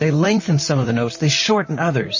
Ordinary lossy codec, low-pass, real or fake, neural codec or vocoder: MP3, 32 kbps; 7.2 kHz; fake; vocoder, 44.1 kHz, 128 mel bands, Pupu-Vocoder